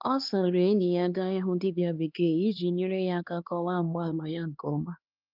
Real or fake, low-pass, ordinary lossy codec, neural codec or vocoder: fake; 5.4 kHz; Opus, 24 kbps; codec, 16 kHz, 2 kbps, X-Codec, HuBERT features, trained on LibriSpeech